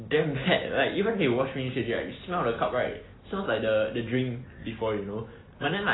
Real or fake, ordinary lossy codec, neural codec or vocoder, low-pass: real; AAC, 16 kbps; none; 7.2 kHz